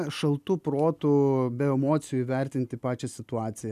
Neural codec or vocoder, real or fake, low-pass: none; real; 14.4 kHz